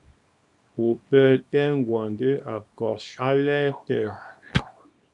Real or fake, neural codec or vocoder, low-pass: fake; codec, 24 kHz, 0.9 kbps, WavTokenizer, small release; 10.8 kHz